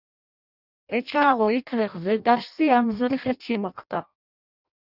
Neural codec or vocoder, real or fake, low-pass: codec, 16 kHz in and 24 kHz out, 0.6 kbps, FireRedTTS-2 codec; fake; 5.4 kHz